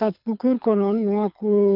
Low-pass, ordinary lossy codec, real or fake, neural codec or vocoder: 5.4 kHz; none; fake; codec, 24 kHz, 6 kbps, HILCodec